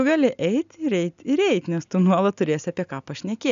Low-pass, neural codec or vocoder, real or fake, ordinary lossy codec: 7.2 kHz; none; real; AAC, 96 kbps